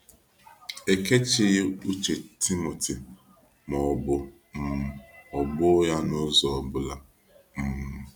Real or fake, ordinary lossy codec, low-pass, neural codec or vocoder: real; none; none; none